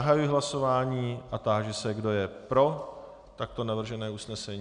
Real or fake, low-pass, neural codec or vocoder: real; 9.9 kHz; none